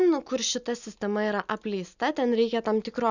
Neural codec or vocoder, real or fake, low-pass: none; real; 7.2 kHz